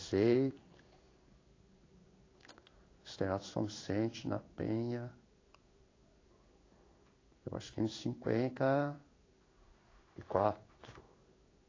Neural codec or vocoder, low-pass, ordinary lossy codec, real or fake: codec, 16 kHz in and 24 kHz out, 1 kbps, XY-Tokenizer; 7.2 kHz; AAC, 32 kbps; fake